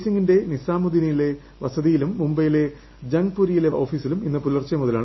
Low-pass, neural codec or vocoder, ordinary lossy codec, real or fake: 7.2 kHz; none; MP3, 24 kbps; real